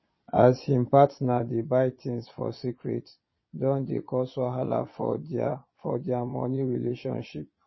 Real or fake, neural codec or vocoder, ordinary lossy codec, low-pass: fake; vocoder, 22.05 kHz, 80 mel bands, Vocos; MP3, 24 kbps; 7.2 kHz